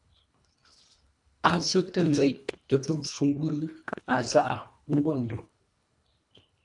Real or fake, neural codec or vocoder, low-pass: fake; codec, 24 kHz, 1.5 kbps, HILCodec; 10.8 kHz